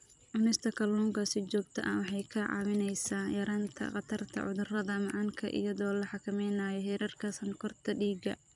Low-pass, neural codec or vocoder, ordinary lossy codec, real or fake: 10.8 kHz; vocoder, 44.1 kHz, 128 mel bands every 256 samples, BigVGAN v2; none; fake